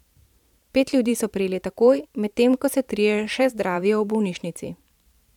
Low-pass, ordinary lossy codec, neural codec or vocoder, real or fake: 19.8 kHz; none; vocoder, 44.1 kHz, 128 mel bands every 512 samples, BigVGAN v2; fake